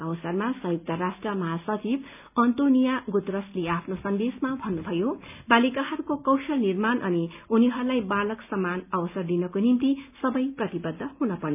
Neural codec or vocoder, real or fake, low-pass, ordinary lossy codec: none; real; 3.6 kHz; none